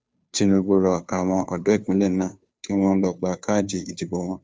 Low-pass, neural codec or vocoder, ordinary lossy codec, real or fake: none; codec, 16 kHz, 2 kbps, FunCodec, trained on Chinese and English, 25 frames a second; none; fake